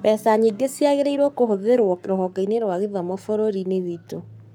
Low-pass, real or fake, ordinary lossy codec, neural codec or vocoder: none; fake; none; codec, 44.1 kHz, 7.8 kbps, Pupu-Codec